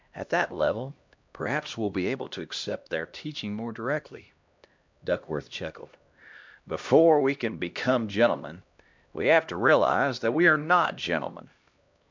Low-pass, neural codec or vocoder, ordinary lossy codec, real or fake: 7.2 kHz; codec, 16 kHz, 1 kbps, X-Codec, HuBERT features, trained on LibriSpeech; MP3, 64 kbps; fake